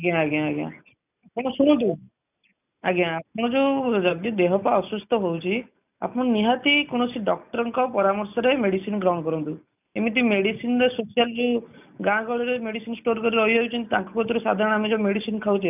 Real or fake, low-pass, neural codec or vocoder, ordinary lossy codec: real; 3.6 kHz; none; none